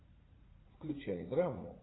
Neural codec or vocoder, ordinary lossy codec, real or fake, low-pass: vocoder, 44.1 kHz, 80 mel bands, Vocos; AAC, 16 kbps; fake; 7.2 kHz